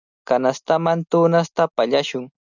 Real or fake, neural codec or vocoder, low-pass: real; none; 7.2 kHz